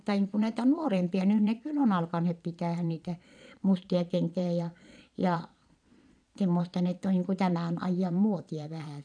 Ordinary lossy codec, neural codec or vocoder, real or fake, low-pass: none; vocoder, 22.05 kHz, 80 mel bands, Vocos; fake; 9.9 kHz